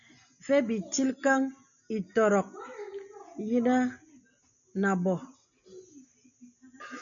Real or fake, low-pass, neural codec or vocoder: real; 7.2 kHz; none